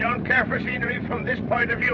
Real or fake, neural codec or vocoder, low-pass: fake; vocoder, 44.1 kHz, 80 mel bands, Vocos; 7.2 kHz